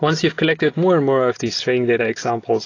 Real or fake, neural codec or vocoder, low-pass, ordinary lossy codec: real; none; 7.2 kHz; AAC, 32 kbps